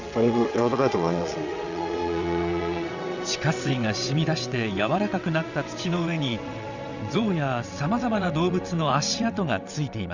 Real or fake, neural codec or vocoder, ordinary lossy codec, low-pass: fake; vocoder, 22.05 kHz, 80 mel bands, WaveNeXt; Opus, 64 kbps; 7.2 kHz